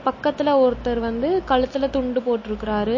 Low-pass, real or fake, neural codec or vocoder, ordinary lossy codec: 7.2 kHz; real; none; MP3, 32 kbps